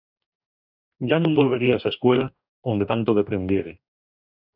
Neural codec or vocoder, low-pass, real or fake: codec, 44.1 kHz, 2.6 kbps, DAC; 5.4 kHz; fake